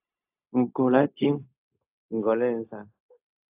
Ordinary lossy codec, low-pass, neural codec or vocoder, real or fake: AAC, 32 kbps; 3.6 kHz; codec, 16 kHz, 0.4 kbps, LongCat-Audio-Codec; fake